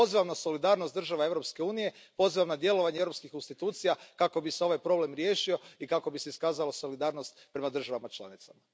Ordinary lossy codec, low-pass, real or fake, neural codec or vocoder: none; none; real; none